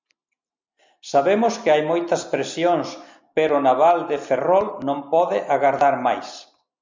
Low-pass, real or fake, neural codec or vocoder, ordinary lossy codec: 7.2 kHz; real; none; MP3, 64 kbps